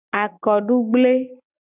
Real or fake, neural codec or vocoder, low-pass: real; none; 3.6 kHz